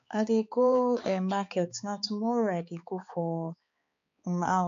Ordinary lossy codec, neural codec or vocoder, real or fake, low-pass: AAC, 64 kbps; codec, 16 kHz, 4 kbps, X-Codec, HuBERT features, trained on balanced general audio; fake; 7.2 kHz